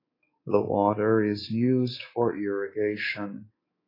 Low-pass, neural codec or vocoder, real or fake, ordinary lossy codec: 5.4 kHz; codec, 24 kHz, 3.1 kbps, DualCodec; fake; AAC, 24 kbps